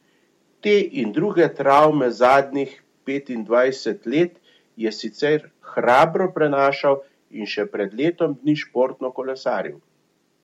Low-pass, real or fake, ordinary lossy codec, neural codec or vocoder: 19.8 kHz; real; MP3, 64 kbps; none